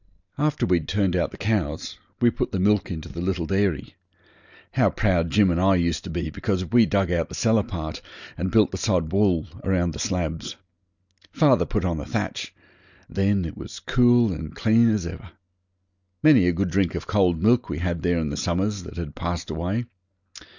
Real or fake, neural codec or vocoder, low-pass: real; none; 7.2 kHz